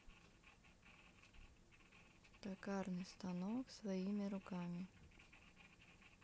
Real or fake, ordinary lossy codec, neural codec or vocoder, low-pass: real; none; none; none